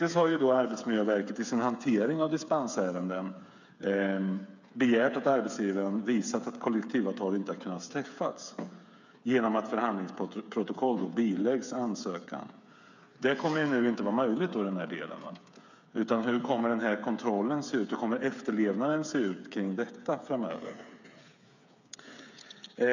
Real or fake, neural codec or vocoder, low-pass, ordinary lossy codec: fake; codec, 16 kHz, 8 kbps, FreqCodec, smaller model; 7.2 kHz; none